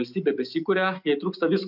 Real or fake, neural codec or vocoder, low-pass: real; none; 5.4 kHz